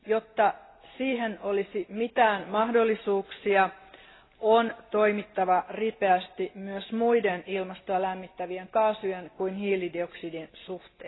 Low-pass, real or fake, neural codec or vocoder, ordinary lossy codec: 7.2 kHz; real; none; AAC, 16 kbps